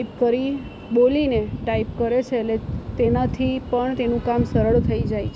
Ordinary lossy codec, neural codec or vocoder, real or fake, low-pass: none; none; real; none